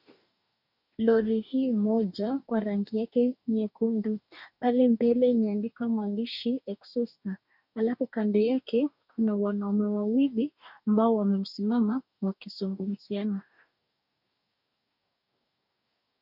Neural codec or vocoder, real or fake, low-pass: codec, 44.1 kHz, 2.6 kbps, DAC; fake; 5.4 kHz